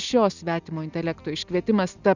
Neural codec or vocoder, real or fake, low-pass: none; real; 7.2 kHz